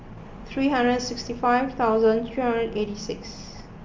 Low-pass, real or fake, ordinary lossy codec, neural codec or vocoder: 7.2 kHz; real; Opus, 32 kbps; none